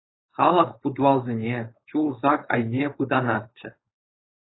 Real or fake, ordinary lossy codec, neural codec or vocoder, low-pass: fake; AAC, 16 kbps; codec, 16 kHz, 4.8 kbps, FACodec; 7.2 kHz